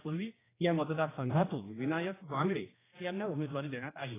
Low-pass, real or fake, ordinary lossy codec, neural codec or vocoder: 3.6 kHz; fake; AAC, 16 kbps; codec, 16 kHz, 1 kbps, X-Codec, HuBERT features, trained on general audio